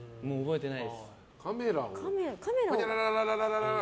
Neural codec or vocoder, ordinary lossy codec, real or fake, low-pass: none; none; real; none